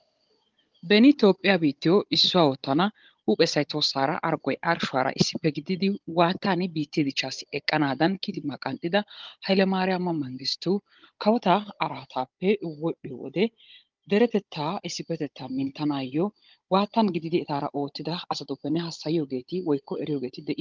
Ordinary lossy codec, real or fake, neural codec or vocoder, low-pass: Opus, 32 kbps; fake; codec, 16 kHz, 16 kbps, FunCodec, trained on Chinese and English, 50 frames a second; 7.2 kHz